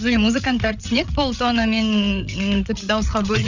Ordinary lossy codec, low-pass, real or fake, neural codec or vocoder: none; 7.2 kHz; fake; codec, 16 kHz, 8 kbps, FreqCodec, larger model